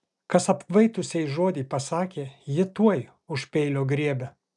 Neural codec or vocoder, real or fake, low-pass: none; real; 10.8 kHz